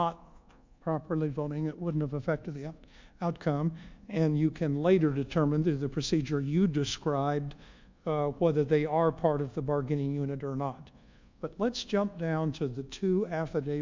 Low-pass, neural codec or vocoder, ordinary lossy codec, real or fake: 7.2 kHz; codec, 24 kHz, 1.2 kbps, DualCodec; MP3, 64 kbps; fake